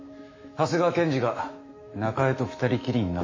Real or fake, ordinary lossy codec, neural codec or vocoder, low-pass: fake; MP3, 32 kbps; autoencoder, 48 kHz, 128 numbers a frame, DAC-VAE, trained on Japanese speech; 7.2 kHz